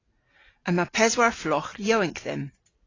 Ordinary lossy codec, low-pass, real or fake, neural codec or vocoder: AAC, 32 kbps; 7.2 kHz; real; none